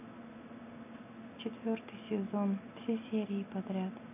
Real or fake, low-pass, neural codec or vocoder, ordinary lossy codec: real; 3.6 kHz; none; none